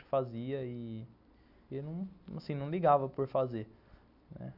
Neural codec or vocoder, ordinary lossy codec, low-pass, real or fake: none; none; 5.4 kHz; real